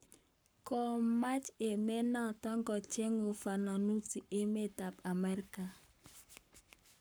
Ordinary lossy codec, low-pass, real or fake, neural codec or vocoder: none; none; fake; codec, 44.1 kHz, 7.8 kbps, Pupu-Codec